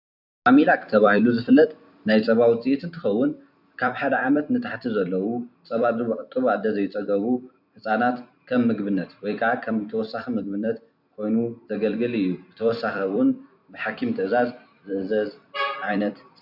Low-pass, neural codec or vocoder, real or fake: 5.4 kHz; vocoder, 44.1 kHz, 128 mel bands every 256 samples, BigVGAN v2; fake